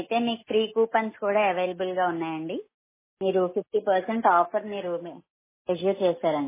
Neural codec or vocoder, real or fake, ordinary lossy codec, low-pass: none; real; MP3, 16 kbps; 3.6 kHz